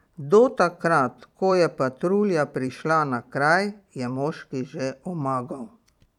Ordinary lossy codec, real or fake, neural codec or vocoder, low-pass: none; fake; vocoder, 44.1 kHz, 128 mel bands every 256 samples, BigVGAN v2; 19.8 kHz